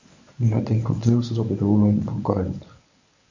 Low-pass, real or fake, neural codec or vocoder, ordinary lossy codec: 7.2 kHz; fake; codec, 24 kHz, 0.9 kbps, WavTokenizer, medium speech release version 1; AAC, 48 kbps